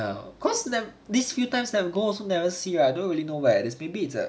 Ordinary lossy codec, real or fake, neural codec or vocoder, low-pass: none; real; none; none